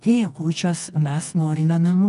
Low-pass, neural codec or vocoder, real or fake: 10.8 kHz; codec, 24 kHz, 0.9 kbps, WavTokenizer, medium music audio release; fake